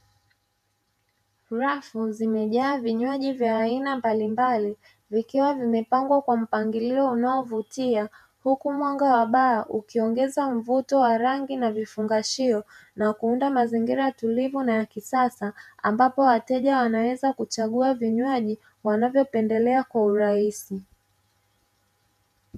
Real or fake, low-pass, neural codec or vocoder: fake; 14.4 kHz; vocoder, 48 kHz, 128 mel bands, Vocos